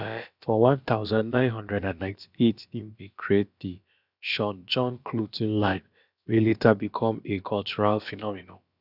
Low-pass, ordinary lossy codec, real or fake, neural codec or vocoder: 5.4 kHz; none; fake; codec, 16 kHz, about 1 kbps, DyCAST, with the encoder's durations